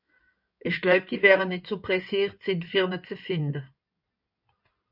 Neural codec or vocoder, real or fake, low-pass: codec, 16 kHz in and 24 kHz out, 2.2 kbps, FireRedTTS-2 codec; fake; 5.4 kHz